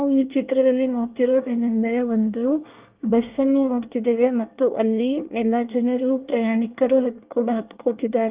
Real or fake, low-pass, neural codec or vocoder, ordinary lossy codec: fake; 3.6 kHz; codec, 24 kHz, 1 kbps, SNAC; Opus, 32 kbps